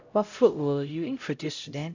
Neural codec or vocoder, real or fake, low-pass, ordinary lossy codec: codec, 16 kHz, 0.5 kbps, X-Codec, HuBERT features, trained on LibriSpeech; fake; 7.2 kHz; AAC, 48 kbps